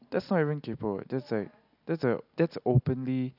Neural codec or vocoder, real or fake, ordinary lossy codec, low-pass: none; real; none; 5.4 kHz